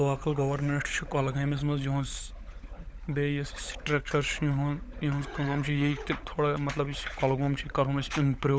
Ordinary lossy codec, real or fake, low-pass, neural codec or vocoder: none; fake; none; codec, 16 kHz, 16 kbps, FunCodec, trained on LibriTTS, 50 frames a second